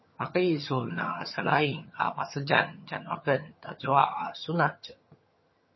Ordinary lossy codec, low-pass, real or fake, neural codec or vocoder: MP3, 24 kbps; 7.2 kHz; fake; vocoder, 22.05 kHz, 80 mel bands, HiFi-GAN